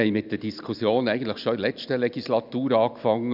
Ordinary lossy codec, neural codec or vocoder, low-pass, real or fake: none; none; 5.4 kHz; real